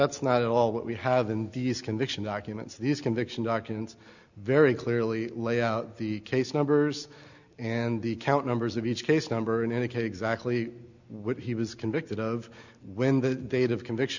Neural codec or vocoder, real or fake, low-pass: none; real; 7.2 kHz